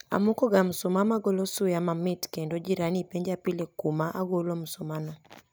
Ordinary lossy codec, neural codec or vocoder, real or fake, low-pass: none; none; real; none